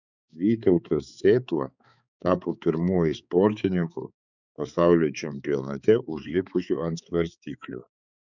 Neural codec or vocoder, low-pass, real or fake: codec, 16 kHz, 4 kbps, X-Codec, HuBERT features, trained on balanced general audio; 7.2 kHz; fake